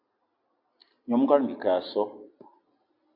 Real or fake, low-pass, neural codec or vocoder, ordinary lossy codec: real; 5.4 kHz; none; MP3, 48 kbps